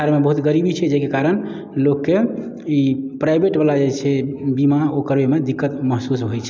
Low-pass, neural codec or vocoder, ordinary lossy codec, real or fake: none; none; none; real